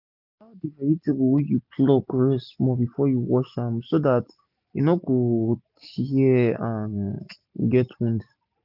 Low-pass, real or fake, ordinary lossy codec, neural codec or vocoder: 5.4 kHz; real; none; none